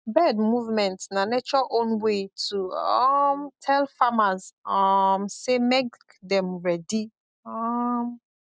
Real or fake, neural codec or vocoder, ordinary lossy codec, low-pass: real; none; none; none